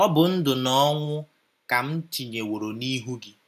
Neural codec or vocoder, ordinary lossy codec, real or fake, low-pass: none; none; real; 14.4 kHz